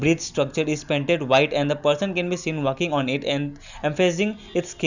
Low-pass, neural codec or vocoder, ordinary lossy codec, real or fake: 7.2 kHz; none; none; real